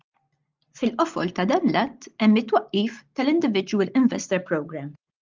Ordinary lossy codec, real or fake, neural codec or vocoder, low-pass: Opus, 32 kbps; real; none; 7.2 kHz